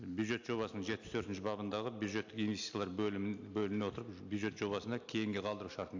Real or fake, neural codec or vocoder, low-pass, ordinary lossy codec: real; none; 7.2 kHz; AAC, 48 kbps